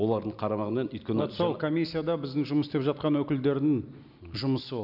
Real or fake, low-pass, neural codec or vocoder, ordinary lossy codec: real; 5.4 kHz; none; none